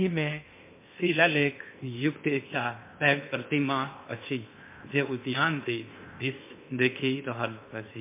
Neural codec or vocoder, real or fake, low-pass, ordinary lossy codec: codec, 16 kHz in and 24 kHz out, 0.6 kbps, FocalCodec, streaming, 2048 codes; fake; 3.6 kHz; MP3, 24 kbps